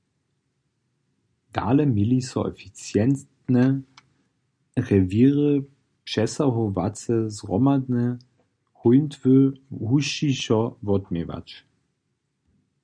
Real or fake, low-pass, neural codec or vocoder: real; 9.9 kHz; none